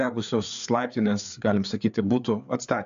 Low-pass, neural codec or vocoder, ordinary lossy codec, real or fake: 7.2 kHz; codec, 16 kHz, 4 kbps, FreqCodec, larger model; AAC, 96 kbps; fake